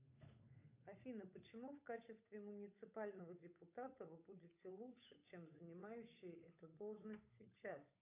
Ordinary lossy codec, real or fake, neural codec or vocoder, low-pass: AAC, 32 kbps; fake; codec, 16 kHz, 8 kbps, FunCodec, trained on Chinese and English, 25 frames a second; 3.6 kHz